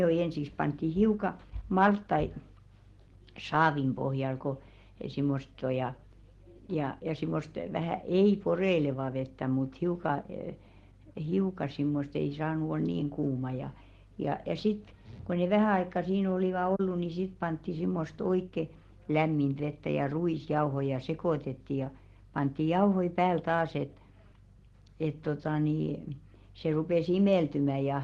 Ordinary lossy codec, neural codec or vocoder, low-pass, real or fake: Opus, 16 kbps; none; 10.8 kHz; real